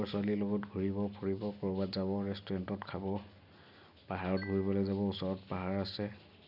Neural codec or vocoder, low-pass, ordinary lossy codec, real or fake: none; 5.4 kHz; none; real